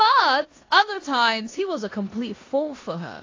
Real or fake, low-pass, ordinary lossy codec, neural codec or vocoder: fake; 7.2 kHz; AAC, 32 kbps; codec, 16 kHz in and 24 kHz out, 0.9 kbps, LongCat-Audio-Codec, four codebook decoder